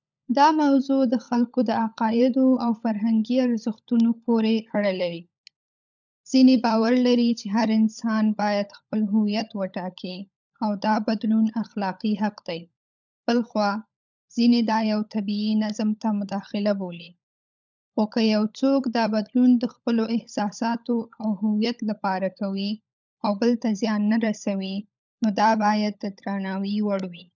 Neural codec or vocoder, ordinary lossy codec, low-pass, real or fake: codec, 16 kHz, 16 kbps, FunCodec, trained on LibriTTS, 50 frames a second; none; 7.2 kHz; fake